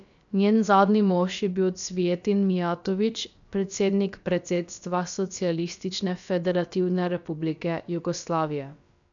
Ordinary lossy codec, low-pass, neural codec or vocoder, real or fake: none; 7.2 kHz; codec, 16 kHz, about 1 kbps, DyCAST, with the encoder's durations; fake